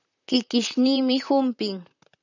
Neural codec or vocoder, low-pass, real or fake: vocoder, 44.1 kHz, 128 mel bands, Pupu-Vocoder; 7.2 kHz; fake